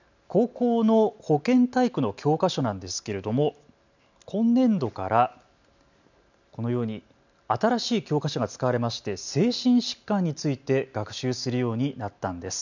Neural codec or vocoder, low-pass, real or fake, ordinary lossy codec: none; 7.2 kHz; real; none